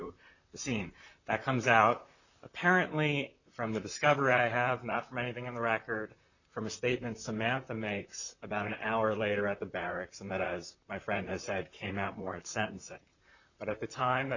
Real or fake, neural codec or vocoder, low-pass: fake; vocoder, 44.1 kHz, 128 mel bands, Pupu-Vocoder; 7.2 kHz